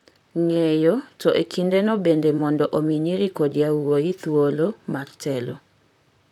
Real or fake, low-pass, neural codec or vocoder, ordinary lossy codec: fake; 19.8 kHz; vocoder, 44.1 kHz, 128 mel bands, Pupu-Vocoder; none